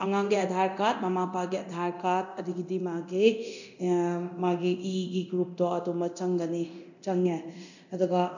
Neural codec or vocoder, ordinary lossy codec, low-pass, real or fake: codec, 24 kHz, 0.9 kbps, DualCodec; none; 7.2 kHz; fake